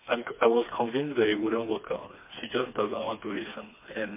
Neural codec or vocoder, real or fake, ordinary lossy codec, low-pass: codec, 16 kHz, 2 kbps, FreqCodec, smaller model; fake; MP3, 24 kbps; 3.6 kHz